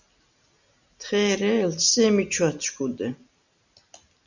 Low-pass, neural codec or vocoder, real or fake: 7.2 kHz; none; real